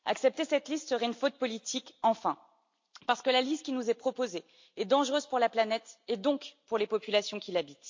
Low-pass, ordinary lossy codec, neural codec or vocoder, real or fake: 7.2 kHz; MP3, 48 kbps; none; real